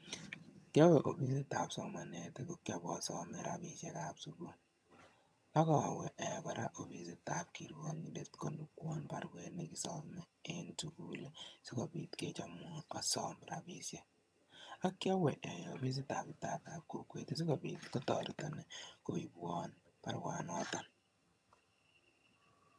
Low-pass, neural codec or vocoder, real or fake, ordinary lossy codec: none; vocoder, 22.05 kHz, 80 mel bands, HiFi-GAN; fake; none